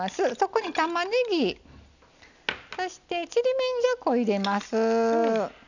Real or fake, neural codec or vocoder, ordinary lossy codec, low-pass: real; none; none; 7.2 kHz